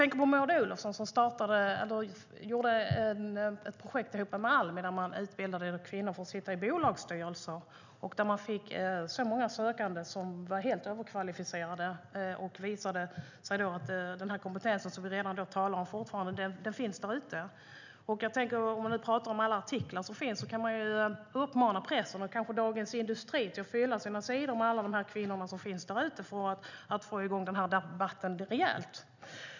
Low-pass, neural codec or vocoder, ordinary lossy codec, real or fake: 7.2 kHz; none; none; real